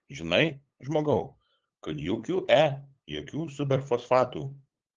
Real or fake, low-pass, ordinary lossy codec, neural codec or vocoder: fake; 7.2 kHz; Opus, 16 kbps; codec, 16 kHz, 8 kbps, FunCodec, trained on LibriTTS, 25 frames a second